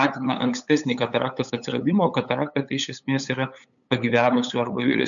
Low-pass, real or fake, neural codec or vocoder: 7.2 kHz; fake; codec, 16 kHz, 8 kbps, FunCodec, trained on LibriTTS, 25 frames a second